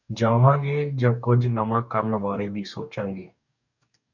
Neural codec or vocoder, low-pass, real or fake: codec, 44.1 kHz, 2.6 kbps, DAC; 7.2 kHz; fake